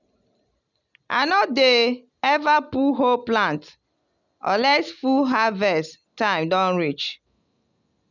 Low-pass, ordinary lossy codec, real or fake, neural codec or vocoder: 7.2 kHz; none; real; none